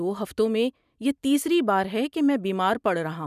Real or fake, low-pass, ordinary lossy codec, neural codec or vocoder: real; 14.4 kHz; none; none